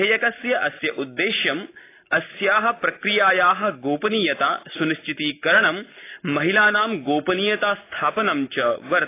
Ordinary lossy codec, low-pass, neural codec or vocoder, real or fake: AAC, 24 kbps; 3.6 kHz; none; real